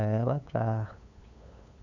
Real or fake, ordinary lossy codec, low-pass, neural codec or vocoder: fake; none; 7.2 kHz; codec, 16 kHz, 8 kbps, FunCodec, trained on LibriTTS, 25 frames a second